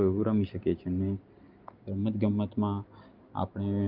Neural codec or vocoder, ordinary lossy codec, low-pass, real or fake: none; Opus, 24 kbps; 5.4 kHz; real